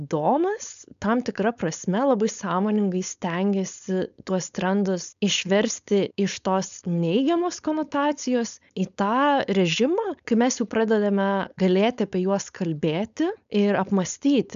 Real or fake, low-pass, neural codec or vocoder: fake; 7.2 kHz; codec, 16 kHz, 4.8 kbps, FACodec